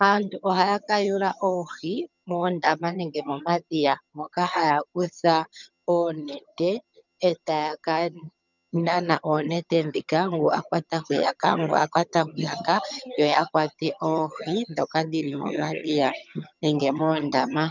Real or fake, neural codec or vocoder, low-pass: fake; vocoder, 22.05 kHz, 80 mel bands, HiFi-GAN; 7.2 kHz